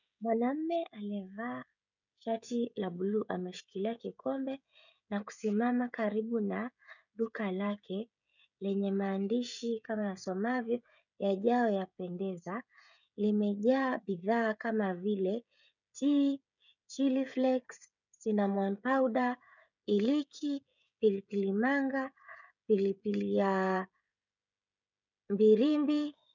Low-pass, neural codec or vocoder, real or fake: 7.2 kHz; codec, 16 kHz, 16 kbps, FreqCodec, smaller model; fake